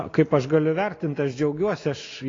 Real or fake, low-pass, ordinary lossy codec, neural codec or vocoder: real; 7.2 kHz; AAC, 32 kbps; none